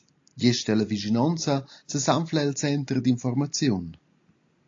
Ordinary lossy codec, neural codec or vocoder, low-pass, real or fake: AAC, 48 kbps; none; 7.2 kHz; real